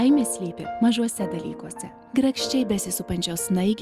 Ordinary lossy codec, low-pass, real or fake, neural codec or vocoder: Opus, 32 kbps; 14.4 kHz; real; none